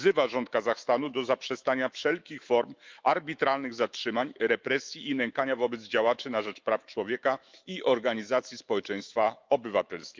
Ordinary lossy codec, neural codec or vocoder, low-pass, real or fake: Opus, 24 kbps; autoencoder, 48 kHz, 128 numbers a frame, DAC-VAE, trained on Japanese speech; 7.2 kHz; fake